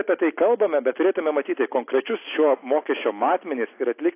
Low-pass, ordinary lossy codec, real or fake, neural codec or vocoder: 3.6 kHz; AAC, 24 kbps; real; none